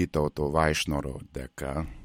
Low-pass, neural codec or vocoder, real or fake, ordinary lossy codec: 19.8 kHz; none; real; MP3, 64 kbps